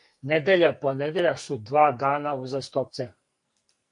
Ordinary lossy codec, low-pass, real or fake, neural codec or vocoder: MP3, 48 kbps; 10.8 kHz; fake; codec, 44.1 kHz, 2.6 kbps, SNAC